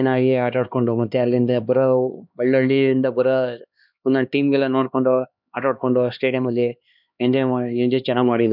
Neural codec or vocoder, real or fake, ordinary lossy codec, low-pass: codec, 16 kHz, 2 kbps, X-Codec, HuBERT features, trained on LibriSpeech; fake; none; 5.4 kHz